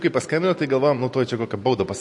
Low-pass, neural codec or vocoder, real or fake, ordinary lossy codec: 10.8 kHz; none; real; MP3, 48 kbps